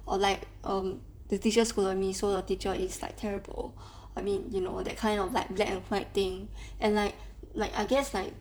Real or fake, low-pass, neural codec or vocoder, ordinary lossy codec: fake; none; vocoder, 44.1 kHz, 128 mel bands, Pupu-Vocoder; none